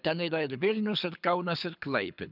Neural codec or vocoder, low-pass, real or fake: codec, 24 kHz, 6 kbps, HILCodec; 5.4 kHz; fake